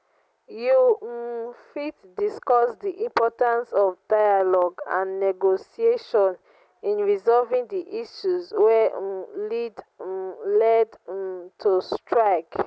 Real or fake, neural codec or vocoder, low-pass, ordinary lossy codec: real; none; none; none